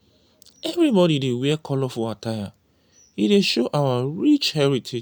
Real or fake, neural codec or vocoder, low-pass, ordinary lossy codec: real; none; none; none